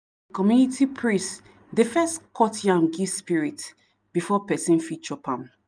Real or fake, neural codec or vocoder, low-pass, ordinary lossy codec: real; none; 9.9 kHz; none